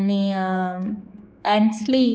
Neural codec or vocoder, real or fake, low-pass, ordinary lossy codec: codec, 16 kHz, 4 kbps, X-Codec, HuBERT features, trained on general audio; fake; none; none